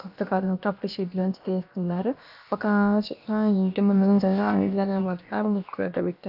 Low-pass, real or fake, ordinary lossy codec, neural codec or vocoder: 5.4 kHz; fake; none; codec, 16 kHz, about 1 kbps, DyCAST, with the encoder's durations